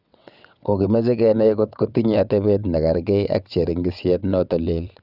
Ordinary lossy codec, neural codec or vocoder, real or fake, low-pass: none; vocoder, 22.05 kHz, 80 mel bands, WaveNeXt; fake; 5.4 kHz